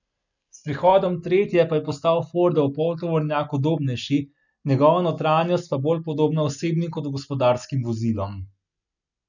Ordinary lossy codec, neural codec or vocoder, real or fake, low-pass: none; none; real; 7.2 kHz